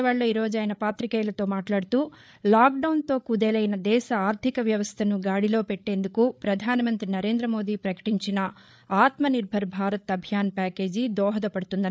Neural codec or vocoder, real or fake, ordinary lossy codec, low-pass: codec, 16 kHz, 8 kbps, FreqCodec, larger model; fake; none; none